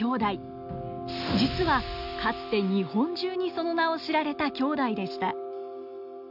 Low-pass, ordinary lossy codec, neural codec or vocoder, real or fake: 5.4 kHz; none; none; real